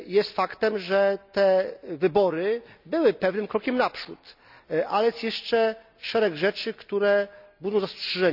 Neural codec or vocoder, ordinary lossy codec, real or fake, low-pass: none; none; real; 5.4 kHz